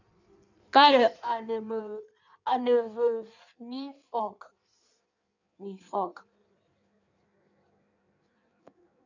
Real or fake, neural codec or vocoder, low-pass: fake; codec, 16 kHz in and 24 kHz out, 1.1 kbps, FireRedTTS-2 codec; 7.2 kHz